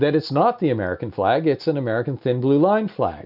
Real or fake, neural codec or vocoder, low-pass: real; none; 5.4 kHz